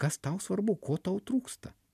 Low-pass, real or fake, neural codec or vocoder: 14.4 kHz; real; none